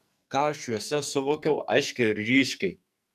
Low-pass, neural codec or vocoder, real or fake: 14.4 kHz; codec, 44.1 kHz, 2.6 kbps, SNAC; fake